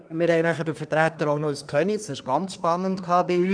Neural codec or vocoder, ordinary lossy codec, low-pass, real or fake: codec, 24 kHz, 1 kbps, SNAC; none; 9.9 kHz; fake